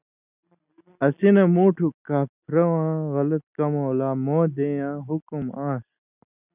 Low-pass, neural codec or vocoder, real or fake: 3.6 kHz; none; real